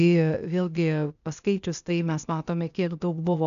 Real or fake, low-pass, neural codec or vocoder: fake; 7.2 kHz; codec, 16 kHz, 0.8 kbps, ZipCodec